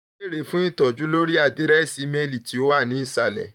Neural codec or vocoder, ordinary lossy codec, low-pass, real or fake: vocoder, 44.1 kHz, 128 mel bands, Pupu-Vocoder; none; 19.8 kHz; fake